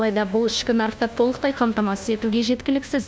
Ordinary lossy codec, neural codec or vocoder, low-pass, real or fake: none; codec, 16 kHz, 1 kbps, FunCodec, trained on LibriTTS, 50 frames a second; none; fake